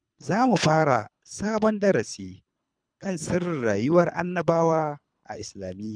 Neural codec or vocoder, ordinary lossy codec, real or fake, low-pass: codec, 24 kHz, 3 kbps, HILCodec; none; fake; 9.9 kHz